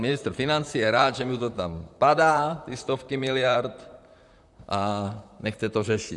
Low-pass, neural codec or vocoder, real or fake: 10.8 kHz; vocoder, 44.1 kHz, 128 mel bands, Pupu-Vocoder; fake